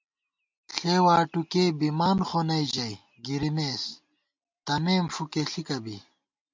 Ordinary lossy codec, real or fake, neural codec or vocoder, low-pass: MP3, 64 kbps; real; none; 7.2 kHz